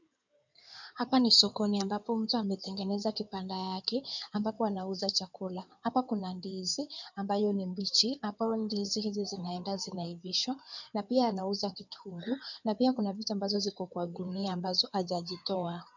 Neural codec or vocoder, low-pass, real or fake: codec, 16 kHz in and 24 kHz out, 2.2 kbps, FireRedTTS-2 codec; 7.2 kHz; fake